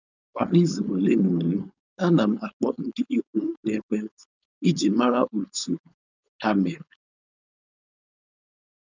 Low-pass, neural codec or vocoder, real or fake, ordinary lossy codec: 7.2 kHz; codec, 16 kHz, 4.8 kbps, FACodec; fake; none